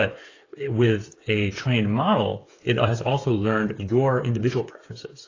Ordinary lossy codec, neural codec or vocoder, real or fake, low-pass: AAC, 32 kbps; codec, 16 kHz, 8 kbps, FreqCodec, smaller model; fake; 7.2 kHz